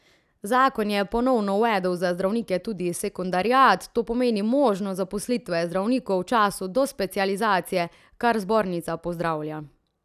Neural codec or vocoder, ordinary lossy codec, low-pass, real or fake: none; none; 14.4 kHz; real